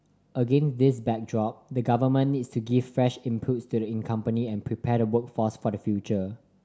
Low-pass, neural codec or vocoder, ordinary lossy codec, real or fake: none; none; none; real